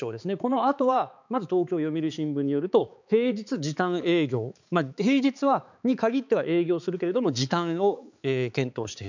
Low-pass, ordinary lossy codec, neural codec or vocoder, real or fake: 7.2 kHz; none; codec, 16 kHz, 4 kbps, X-Codec, HuBERT features, trained on balanced general audio; fake